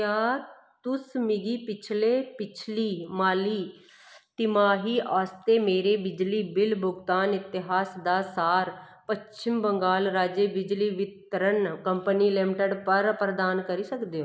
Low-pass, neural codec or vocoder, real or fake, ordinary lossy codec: none; none; real; none